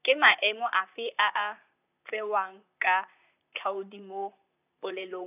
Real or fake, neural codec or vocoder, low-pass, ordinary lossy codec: fake; vocoder, 44.1 kHz, 128 mel bands, Pupu-Vocoder; 3.6 kHz; none